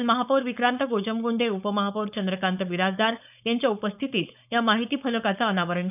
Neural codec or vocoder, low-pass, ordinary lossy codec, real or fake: codec, 16 kHz, 4.8 kbps, FACodec; 3.6 kHz; none; fake